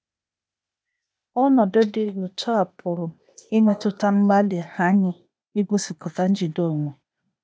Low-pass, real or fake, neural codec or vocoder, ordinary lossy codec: none; fake; codec, 16 kHz, 0.8 kbps, ZipCodec; none